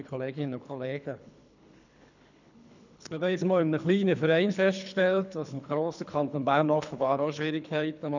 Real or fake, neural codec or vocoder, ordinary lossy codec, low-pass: fake; codec, 24 kHz, 3 kbps, HILCodec; none; 7.2 kHz